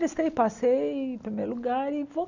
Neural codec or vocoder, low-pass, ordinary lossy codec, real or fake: none; 7.2 kHz; none; real